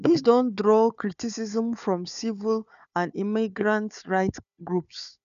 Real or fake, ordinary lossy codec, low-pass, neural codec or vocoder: fake; none; 7.2 kHz; codec, 16 kHz, 4 kbps, FunCodec, trained on Chinese and English, 50 frames a second